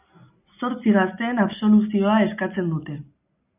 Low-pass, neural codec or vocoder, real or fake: 3.6 kHz; none; real